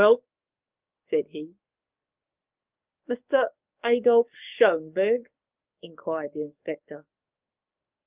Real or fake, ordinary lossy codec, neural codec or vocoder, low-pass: fake; Opus, 24 kbps; codec, 44.1 kHz, 7.8 kbps, DAC; 3.6 kHz